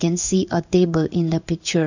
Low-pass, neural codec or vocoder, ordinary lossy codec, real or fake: 7.2 kHz; codec, 16 kHz in and 24 kHz out, 1 kbps, XY-Tokenizer; none; fake